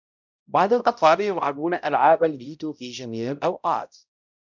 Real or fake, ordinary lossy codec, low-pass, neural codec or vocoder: fake; MP3, 64 kbps; 7.2 kHz; codec, 16 kHz, 0.5 kbps, X-Codec, HuBERT features, trained on balanced general audio